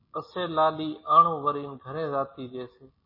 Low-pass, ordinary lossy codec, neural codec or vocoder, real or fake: 5.4 kHz; MP3, 24 kbps; none; real